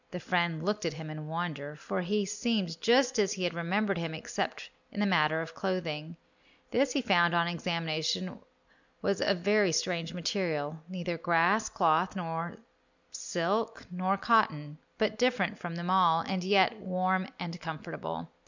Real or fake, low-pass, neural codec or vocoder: real; 7.2 kHz; none